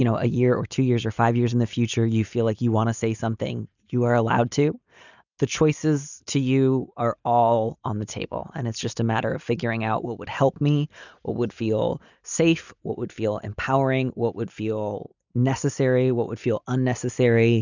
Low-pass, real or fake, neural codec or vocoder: 7.2 kHz; real; none